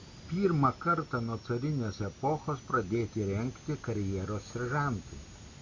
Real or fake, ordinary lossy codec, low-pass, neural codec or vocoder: real; MP3, 64 kbps; 7.2 kHz; none